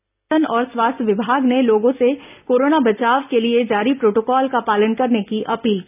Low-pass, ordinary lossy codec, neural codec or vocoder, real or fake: 3.6 kHz; none; none; real